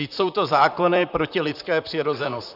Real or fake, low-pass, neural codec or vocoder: fake; 5.4 kHz; vocoder, 44.1 kHz, 128 mel bands, Pupu-Vocoder